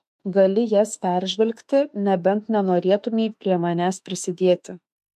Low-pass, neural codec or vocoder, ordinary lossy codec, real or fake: 14.4 kHz; autoencoder, 48 kHz, 32 numbers a frame, DAC-VAE, trained on Japanese speech; MP3, 64 kbps; fake